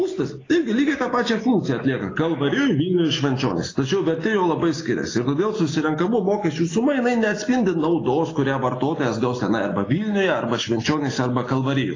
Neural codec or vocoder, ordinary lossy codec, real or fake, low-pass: none; AAC, 32 kbps; real; 7.2 kHz